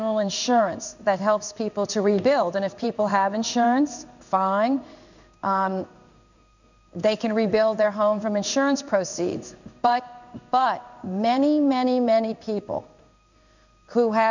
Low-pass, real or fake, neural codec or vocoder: 7.2 kHz; fake; codec, 16 kHz in and 24 kHz out, 1 kbps, XY-Tokenizer